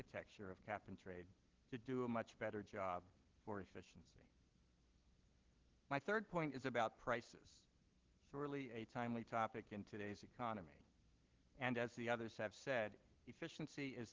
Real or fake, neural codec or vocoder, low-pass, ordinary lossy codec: real; none; 7.2 kHz; Opus, 16 kbps